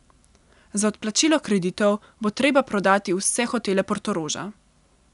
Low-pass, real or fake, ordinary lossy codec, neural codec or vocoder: 10.8 kHz; real; none; none